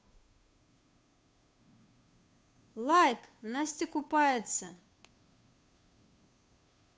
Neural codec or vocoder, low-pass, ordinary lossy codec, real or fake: codec, 16 kHz, 2 kbps, FunCodec, trained on Chinese and English, 25 frames a second; none; none; fake